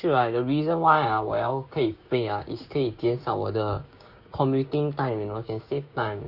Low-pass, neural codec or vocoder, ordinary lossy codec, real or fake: 5.4 kHz; codec, 16 kHz, 6 kbps, DAC; Opus, 64 kbps; fake